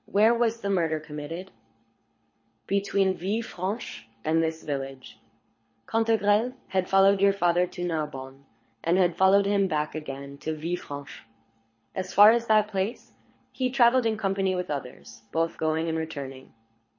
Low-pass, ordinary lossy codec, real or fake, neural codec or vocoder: 7.2 kHz; MP3, 32 kbps; fake; codec, 24 kHz, 6 kbps, HILCodec